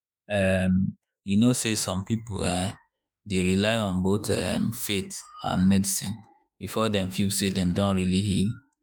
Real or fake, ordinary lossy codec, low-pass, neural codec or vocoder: fake; none; none; autoencoder, 48 kHz, 32 numbers a frame, DAC-VAE, trained on Japanese speech